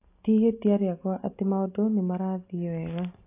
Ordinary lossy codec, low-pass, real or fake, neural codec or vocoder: AAC, 24 kbps; 3.6 kHz; real; none